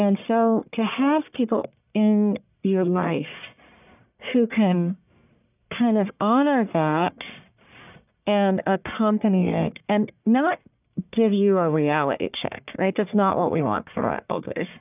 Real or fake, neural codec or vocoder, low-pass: fake; codec, 44.1 kHz, 1.7 kbps, Pupu-Codec; 3.6 kHz